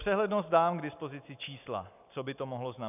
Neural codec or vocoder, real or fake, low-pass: none; real; 3.6 kHz